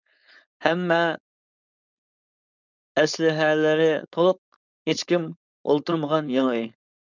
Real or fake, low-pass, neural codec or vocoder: fake; 7.2 kHz; codec, 16 kHz, 4.8 kbps, FACodec